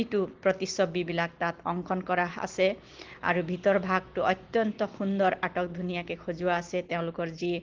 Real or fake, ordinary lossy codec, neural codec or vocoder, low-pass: real; Opus, 16 kbps; none; 7.2 kHz